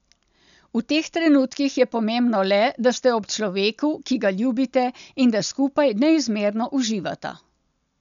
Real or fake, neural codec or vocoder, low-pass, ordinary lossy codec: real; none; 7.2 kHz; none